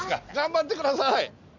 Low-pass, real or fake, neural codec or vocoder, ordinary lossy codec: 7.2 kHz; real; none; none